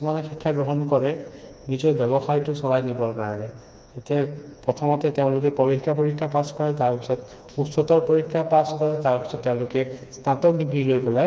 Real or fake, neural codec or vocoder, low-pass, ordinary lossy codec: fake; codec, 16 kHz, 2 kbps, FreqCodec, smaller model; none; none